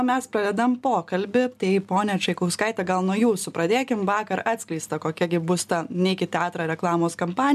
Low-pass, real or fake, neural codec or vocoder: 14.4 kHz; real; none